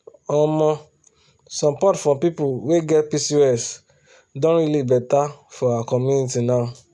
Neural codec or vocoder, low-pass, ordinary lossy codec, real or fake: none; none; none; real